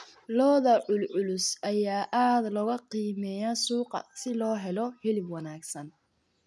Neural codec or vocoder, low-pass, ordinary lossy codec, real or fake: none; none; none; real